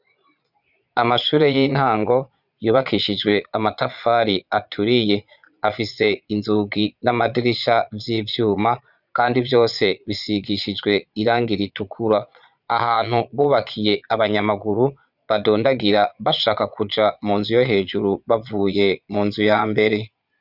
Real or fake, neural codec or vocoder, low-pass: fake; vocoder, 22.05 kHz, 80 mel bands, Vocos; 5.4 kHz